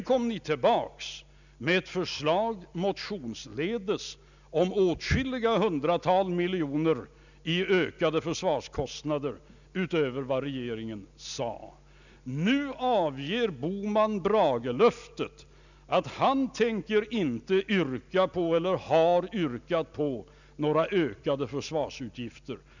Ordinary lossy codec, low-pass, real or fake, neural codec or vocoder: none; 7.2 kHz; real; none